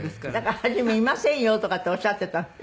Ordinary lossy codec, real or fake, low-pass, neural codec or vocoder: none; real; none; none